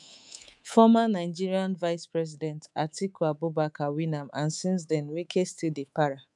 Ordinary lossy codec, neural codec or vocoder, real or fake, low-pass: none; codec, 24 kHz, 3.1 kbps, DualCodec; fake; 10.8 kHz